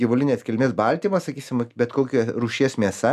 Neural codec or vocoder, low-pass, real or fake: none; 14.4 kHz; real